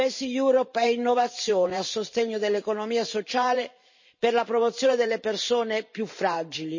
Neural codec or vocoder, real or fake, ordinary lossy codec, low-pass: vocoder, 44.1 kHz, 128 mel bands every 512 samples, BigVGAN v2; fake; MP3, 32 kbps; 7.2 kHz